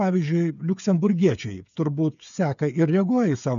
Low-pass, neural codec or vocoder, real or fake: 7.2 kHz; codec, 16 kHz, 8 kbps, FreqCodec, smaller model; fake